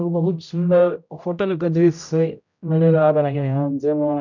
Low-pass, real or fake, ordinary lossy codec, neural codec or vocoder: 7.2 kHz; fake; none; codec, 16 kHz, 0.5 kbps, X-Codec, HuBERT features, trained on general audio